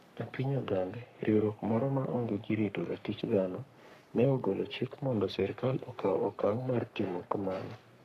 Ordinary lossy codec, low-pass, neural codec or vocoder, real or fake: none; 14.4 kHz; codec, 44.1 kHz, 3.4 kbps, Pupu-Codec; fake